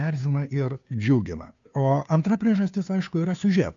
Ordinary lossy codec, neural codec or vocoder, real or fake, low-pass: AAC, 64 kbps; codec, 16 kHz, 2 kbps, FunCodec, trained on Chinese and English, 25 frames a second; fake; 7.2 kHz